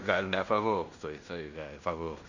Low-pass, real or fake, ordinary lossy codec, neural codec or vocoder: 7.2 kHz; fake; Opus, 64 kbps; codec, 16 kHz in and 24 kHz out, 0.9 kbps, LongCat-Audio-Codec, fine tuned four codebook decoder